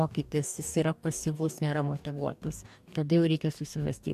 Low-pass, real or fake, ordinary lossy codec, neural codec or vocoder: 14.4 kHz; fake; AAC, 96 kbps; codec, 44.1 kHz, 2.6 kbps, DAC